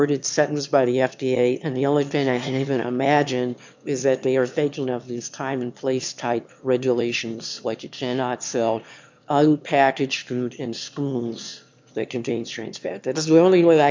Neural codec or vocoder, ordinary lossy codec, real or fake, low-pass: autoencoder, 22.05 kHz, a latent of 192 numbers a frame, VITS, trained on one speaker; MP3, 64 kbps; fake; 7.2 kHz